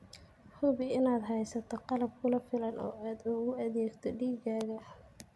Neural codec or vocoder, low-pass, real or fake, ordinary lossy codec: none; none; real; none